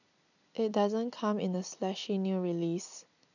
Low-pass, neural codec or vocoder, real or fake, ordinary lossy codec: 7.2 kHz; none; real; none